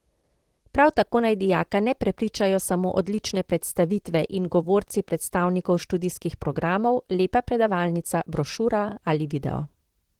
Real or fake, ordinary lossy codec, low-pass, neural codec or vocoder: fake; Opus, 16 kbps; 19.8 kHz; vocoder, 44.1 kHz, 128 mel bands, Pupu-Vocoder